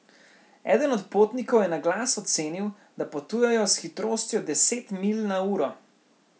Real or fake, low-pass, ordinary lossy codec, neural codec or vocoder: real; none; none; none